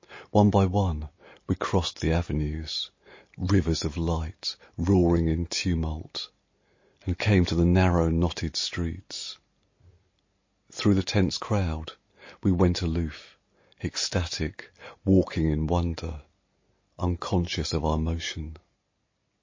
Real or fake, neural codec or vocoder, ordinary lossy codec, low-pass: real; none; MP3, 32 kbps; 7.2 kHz